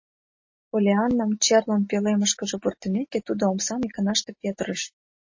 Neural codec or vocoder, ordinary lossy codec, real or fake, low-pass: none; MP3, 32 kbps; real; 7.2 kHz